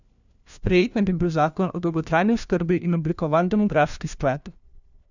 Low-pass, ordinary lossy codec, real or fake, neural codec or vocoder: 7.2 kHz; none; fake; codec, 16 kHz, 1 kbps, FunCodec, trained on LibriTTS, 50 frames a second